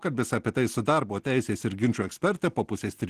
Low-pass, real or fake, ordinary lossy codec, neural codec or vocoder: 14.4 kHz; real; Opus, 16 kbps; none